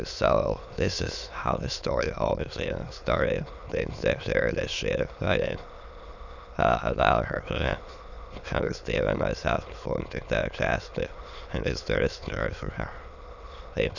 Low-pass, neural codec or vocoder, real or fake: 7.2 kHz; autoencoder, 22.05 kHz, a latent of 192 numbers a frame, VITS, trained on many speakers; fake